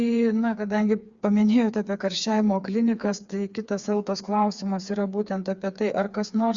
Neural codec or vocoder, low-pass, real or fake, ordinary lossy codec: codec, 16 kHz, 4 kbps, FreqCodec, smaller model; 7.2 kHz; fake; Opus, 64 kbps